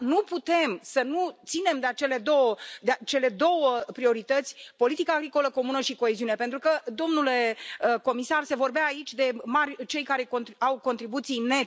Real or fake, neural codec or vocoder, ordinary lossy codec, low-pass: real; none; none; none